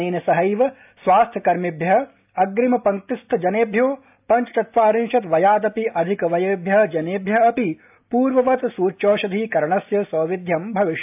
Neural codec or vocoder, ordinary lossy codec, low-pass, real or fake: none; AAC, 32 kbps; 3.6 kHz; real